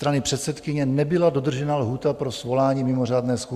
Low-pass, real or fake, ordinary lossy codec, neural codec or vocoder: 14.4 kHz; real; Opus, 64 kbps; none